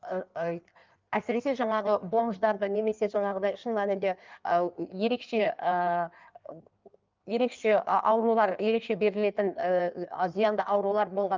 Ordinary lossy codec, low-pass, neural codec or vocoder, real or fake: Opus, 24 kbps; 7.2 kHz; codec, 16 kHz in and 24 kHz out, 1.1 kbps, FireRedTTS-2 codec; fake